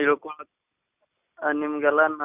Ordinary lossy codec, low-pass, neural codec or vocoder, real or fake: none; 3.6 kHz; none; real